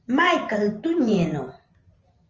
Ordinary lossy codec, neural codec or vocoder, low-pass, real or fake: Opus, 24 kbps; vocoder, 44.1 kHz, 128 mel bands every 512 samples, BigVGAN v2; 7.2 kHz; fake